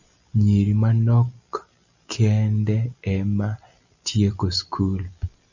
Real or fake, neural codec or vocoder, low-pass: real; none; 7.2 kHz